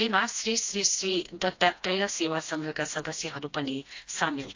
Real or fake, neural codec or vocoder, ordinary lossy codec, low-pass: fake; codec, 16 kHz, 1 kbps, FreqCodec, smaller model; AAC, 48 kbps; 7.2 kHz